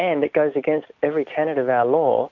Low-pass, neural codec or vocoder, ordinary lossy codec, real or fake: 7.2 kHz; none; MP3, 48 kbps; real